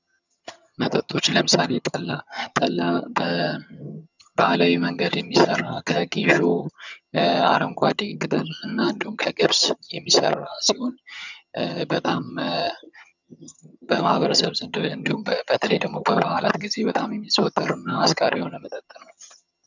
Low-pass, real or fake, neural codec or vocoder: 7.2 kHz; fake; vocoder, 22.05 kHz, 80 mel bands, HiFi-GAN